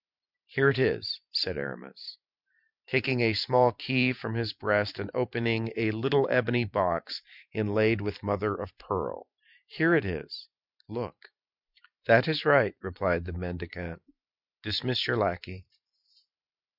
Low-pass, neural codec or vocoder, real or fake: 5.4 kHz; vocoder, 44.1 kHz, 128 mel bands every 512 samples, BigVGAN v2; fake